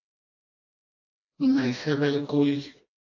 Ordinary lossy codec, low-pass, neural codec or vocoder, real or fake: AAC, 48 kbps; 7.2 kHz; codec, 16 kHz, 1 kbps, FreqCodec, smaller model; fake